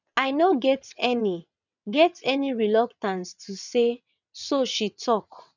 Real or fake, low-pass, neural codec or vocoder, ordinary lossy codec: fake; 7.2 kHz; vocoder, 22.05 kHz, 80 mel bands, WaveNeXt; none